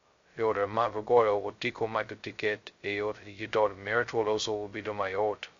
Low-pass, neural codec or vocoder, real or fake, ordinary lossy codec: 7.2 kHz; codec, 16 kHz, 0.2 kbps, FocalCodec; fake; MP3, 48 kbps